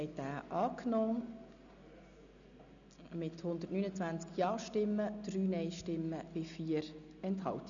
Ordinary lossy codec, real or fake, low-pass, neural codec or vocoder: none; real; 7.2 kHz; none